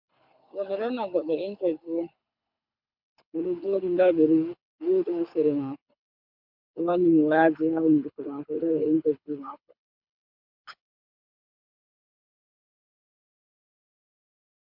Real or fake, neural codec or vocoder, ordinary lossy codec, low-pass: fake; codec, 24 kHz, 6 kbps, HILCodec; MP3, 48 kbps; 5.4 kHz